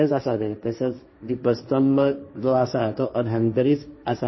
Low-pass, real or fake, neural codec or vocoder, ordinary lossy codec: 7.2 kHz; fake; codec, 16 kHz, 1.1 kbps, Voila-Tokenizer; MP3, 24 kbps